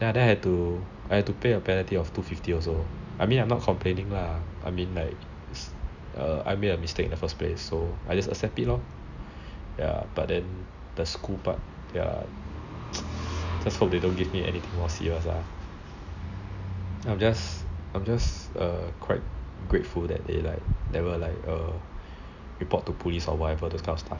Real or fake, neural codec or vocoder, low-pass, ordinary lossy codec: real; none; 7.2 kHz; none